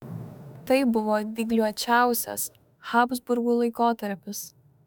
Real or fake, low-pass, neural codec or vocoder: fake; 19.8 kHz; autoencoder, 48 kHz, 32 numbers a frame, DAC-VAE, trained on Japanese speech